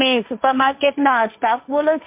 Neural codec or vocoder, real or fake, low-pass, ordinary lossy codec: codec, 16 kHz, 1.1 kbps, Voila-Tokenizer; fake; 3.6 kHz; MP3, 32 kbps